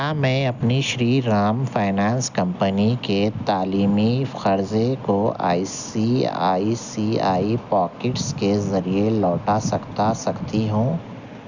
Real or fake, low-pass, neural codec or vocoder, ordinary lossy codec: real; 7.2 kHz; none; none